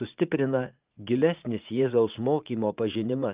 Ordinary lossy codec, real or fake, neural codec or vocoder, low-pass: Opus, 24 kbps; fake; vocoder, 44.1 kHz, 80 mel bands, Vocos; 3.6 kHz